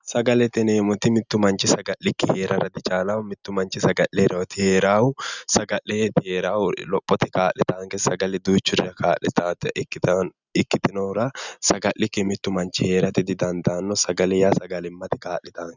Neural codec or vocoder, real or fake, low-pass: none; real; 7.2 kHz